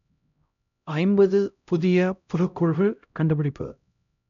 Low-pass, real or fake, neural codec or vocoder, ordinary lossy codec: 7.2 kHz; fake; codec, 16 kHz, 0.5 kbps, X-Codec, HuBERT features, trained on LibriSpeech; none